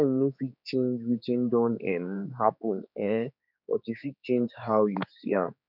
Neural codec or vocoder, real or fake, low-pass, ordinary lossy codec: codec, 16 kHz, 4 kbps, X-Codec, HuBERT features, trained on general audio; fake; 5.4 kHz; none